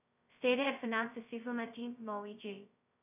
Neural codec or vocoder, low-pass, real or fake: codec, 16 kHz, 0.2 kbps, FocalCodec; 3.6 kHz; fake